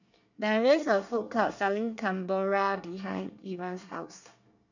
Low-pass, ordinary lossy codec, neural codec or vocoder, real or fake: 7.2 kHz; none; codec, 24 kHz, 1 kbps, SNAC; fake